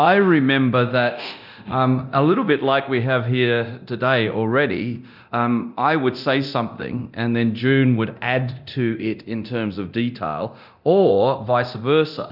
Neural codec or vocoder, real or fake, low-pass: codec, 24 kHz, 0.9 kbps, DualCodec; fake; 5.4 kHz